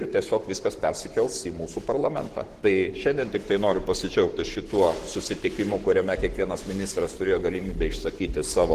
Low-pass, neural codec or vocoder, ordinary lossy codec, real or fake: 14.4 kHz; codec, 44.1 kHz, 7.8 kbps, Pupu-Codec; Opus, 16 kbps; fake